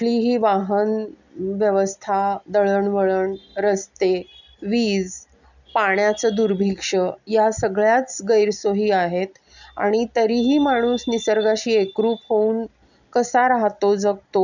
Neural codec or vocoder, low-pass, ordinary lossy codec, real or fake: none; 7.2 kHz; none; real